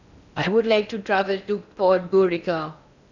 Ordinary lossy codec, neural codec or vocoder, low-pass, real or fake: none; codec, 16 kHz in and 24 kHz out, 0.6 kbps, FocalCodec, streaming, 4096 codes; 7.2 kHz; fake